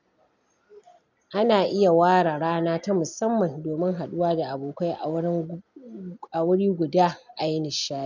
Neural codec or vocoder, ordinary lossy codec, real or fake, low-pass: none; none; real; 7.2 kHz